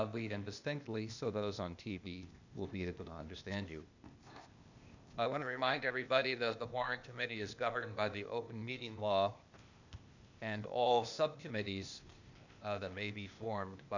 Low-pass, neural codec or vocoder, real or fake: 7.2 kHz; codec, 16 kHz, 0.8 kbps, ZipCodec; fake